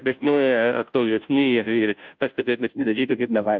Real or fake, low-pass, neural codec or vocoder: fake; 7.2 kHz; codec, 16 kHz, 0.5 kbps, FunCodec, trained on Chinese and English, 25 frames a second